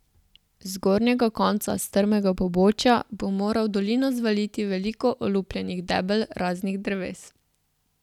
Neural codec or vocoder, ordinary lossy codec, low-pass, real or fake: none; none; 19.8 kHz; real